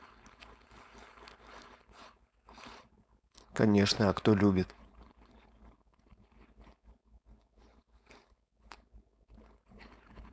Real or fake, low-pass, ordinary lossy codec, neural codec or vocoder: fake; none; none; codec, 16 kHz, 4.8 kbps, FACodec